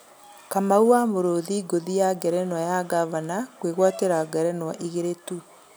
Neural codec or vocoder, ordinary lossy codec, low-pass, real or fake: none; none; none; real